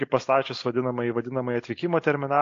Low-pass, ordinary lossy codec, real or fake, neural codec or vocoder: 7.2 kHz; AAC, 48 kbps; real; none